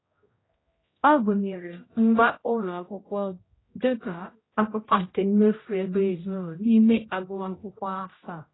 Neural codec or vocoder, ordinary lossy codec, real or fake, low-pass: codec, 16 kHz, 0.5 kbps, X-Codec, HuBERT features, trained on general audio; AAC, 16 kbps; fake; 7.2 kHz